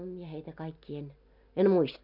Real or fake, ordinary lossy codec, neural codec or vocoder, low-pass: real; none; none; 5.4 kHz